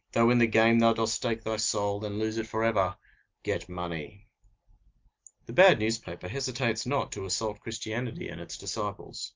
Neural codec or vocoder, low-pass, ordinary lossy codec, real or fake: none; 7.2 kHz; Opus, 32 kbps; real